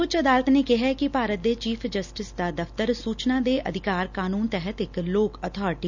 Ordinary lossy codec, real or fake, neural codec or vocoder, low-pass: none; real; none; 7.2 kHz